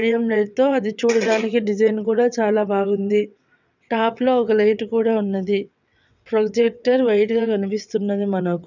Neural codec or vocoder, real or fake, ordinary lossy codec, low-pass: vocoder, 22.05 kHz, 80 mel bands, WaveNeXt; fake; none; 7.2 kHz